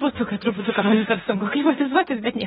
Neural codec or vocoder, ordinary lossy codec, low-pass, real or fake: autoencoder, 48 kHz, 32 numbers a frame, DAC-VAE, trained on Japanese speech; AAC, 16 kbps; 19.8 kHz; fake